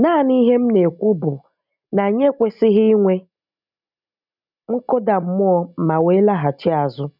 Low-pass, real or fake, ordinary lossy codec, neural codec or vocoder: 5.4 kHz; real; none; none